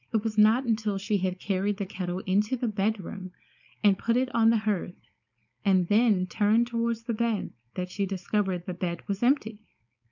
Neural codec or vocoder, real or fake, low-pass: codec, 16 kHz, 4.8 kbps, FACodec; fake; 7.2 kHz